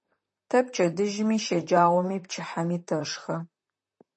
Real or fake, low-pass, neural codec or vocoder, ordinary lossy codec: fake; 10.8 kHz; vocoder, 44.1 kHz, 128 mel bands, Pupu-Vocoder; MP3, 32 kbps